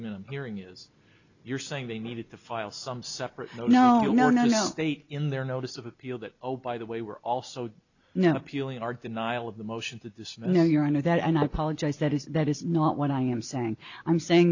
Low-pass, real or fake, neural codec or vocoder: 7.2 kHz; real; none